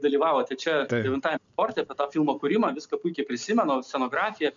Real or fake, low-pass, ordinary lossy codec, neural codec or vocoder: real; 7.2 kHz; MP3, 96 kbps; none